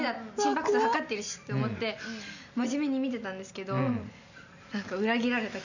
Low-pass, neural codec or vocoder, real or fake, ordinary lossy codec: 7.2 kHz; none; real; none